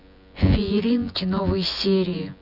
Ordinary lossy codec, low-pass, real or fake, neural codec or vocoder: none; 5.4 kHz; fake; vocoder, 24 kHz, 100 mel bands, Vocos